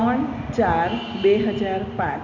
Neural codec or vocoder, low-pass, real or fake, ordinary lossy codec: none; 7.2 kHz; real; none